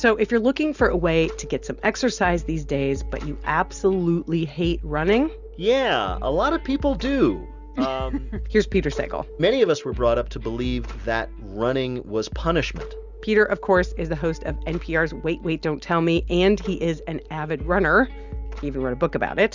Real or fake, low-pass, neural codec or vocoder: real; 7.2 kHz; none